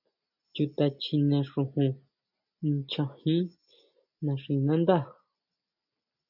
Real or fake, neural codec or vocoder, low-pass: real; none; 5.4 kHz